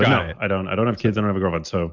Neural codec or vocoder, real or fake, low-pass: none; real; 7.2 kHz